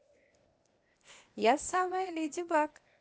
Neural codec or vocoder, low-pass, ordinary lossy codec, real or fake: codec, 16 kHz, 0.8 kbps, ZipCodec; none; none; fake